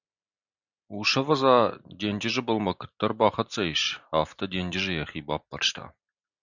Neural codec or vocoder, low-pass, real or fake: none; 7.2 kHz; real